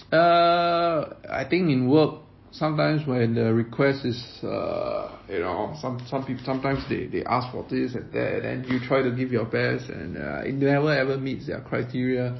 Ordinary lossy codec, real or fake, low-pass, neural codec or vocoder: MP3, 24 kbps; real; 7.2 kHz; none